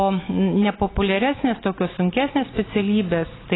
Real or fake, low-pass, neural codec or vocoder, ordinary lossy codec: real; 7.2 kHz; none; AAC, 16 kbps